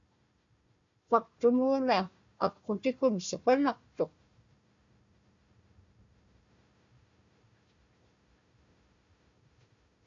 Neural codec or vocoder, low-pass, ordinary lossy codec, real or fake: codec, 16 kHz, 1 kbps, FunCodec, trained on Chinese and English, 50 frames a second; 7.2 kHz; MP3, 64 kbps; fake